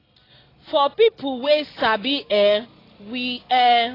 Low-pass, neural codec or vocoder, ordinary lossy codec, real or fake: 5.4 kHz; none; AAC, 24 kbps; real